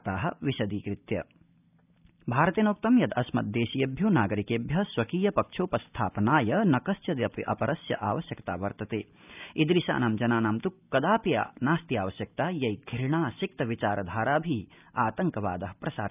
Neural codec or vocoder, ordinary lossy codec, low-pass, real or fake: none; none; 3.6 kHz; real